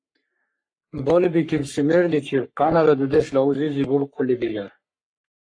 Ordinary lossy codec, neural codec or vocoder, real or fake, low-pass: AAC, 48 kbps; codec, 44.1 kHz, 3.4 kbps, Pupu-Codec; fake; 9.9 kHz